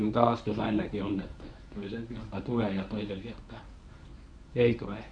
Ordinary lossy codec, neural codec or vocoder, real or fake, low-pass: none; codec, 24 kHz, 0.9 kbps, WavTokenizer, medium speech release version 1; fake; 9.9 kHz